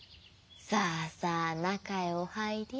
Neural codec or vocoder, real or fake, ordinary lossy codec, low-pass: none; real; none; none